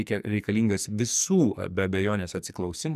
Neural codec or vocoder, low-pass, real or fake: codec, 44.1 kHz, 2.6 kbps, SNAC; 14.4 kHz; fake